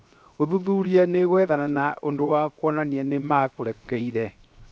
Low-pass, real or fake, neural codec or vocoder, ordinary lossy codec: none; fake; codec, 16 kHz, 0.7 kbps, FocalCodec; none